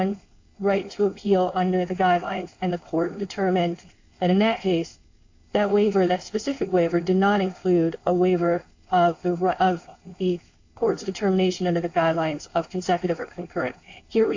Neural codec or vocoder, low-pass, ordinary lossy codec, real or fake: codec, 16 kHz, 4.8 kbps, FACodec; 7.2 kHz; AAC, 48 kbps; fake